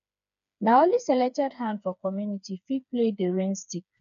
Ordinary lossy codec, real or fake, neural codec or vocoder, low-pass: none; fake; codec, 16 kHz, 4 kbps, FreqCodec, smaller model; 7.2 kHz